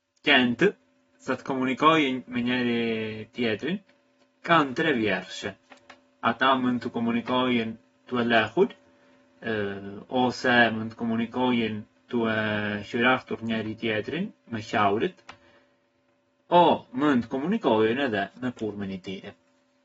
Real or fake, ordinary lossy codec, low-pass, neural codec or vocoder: real; AAC, 24 kbps; 19.8 kHz; none